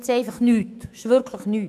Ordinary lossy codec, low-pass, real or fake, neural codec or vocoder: AAC, 96 kbps; 14.4 kHz; fake; autoencoder, 48 kHz, 128 numbers a frame, DAC-VAE, trained on Japanese speech